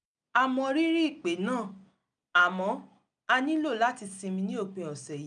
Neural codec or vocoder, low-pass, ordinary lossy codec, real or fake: none; 9.9 kHz; none; real